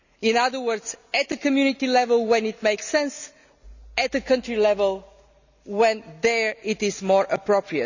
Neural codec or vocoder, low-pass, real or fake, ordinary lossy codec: none; 7.2 kHz; real; none